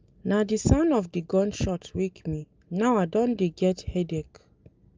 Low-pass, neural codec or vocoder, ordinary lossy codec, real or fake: 7.2 kHz; none; Opus, 24 kbps; real